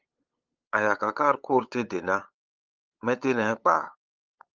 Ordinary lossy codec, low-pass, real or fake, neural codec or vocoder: Opus, 24 kbps; 7.2 kHz; fake; codec, 16 kHz, 8 kbps, FunCodec, trained on LibriTTS, 25 frames a second